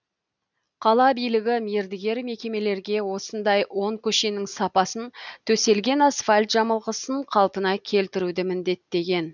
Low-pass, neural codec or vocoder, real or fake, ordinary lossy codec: 7.2 kHz; none; real; none